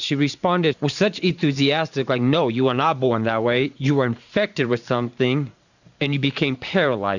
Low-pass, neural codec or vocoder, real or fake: 7.2 kHz; vocoder, 44.1 kHz, 128 mel bands every 512 samples, BigVGAN v2; fake